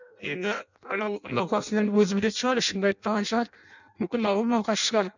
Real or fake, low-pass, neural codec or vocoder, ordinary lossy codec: fake; 7.2 kHz; codec, 16 kHz in and 24 kHz out, 0.6 kbps, FireRedTTS-2 codec; none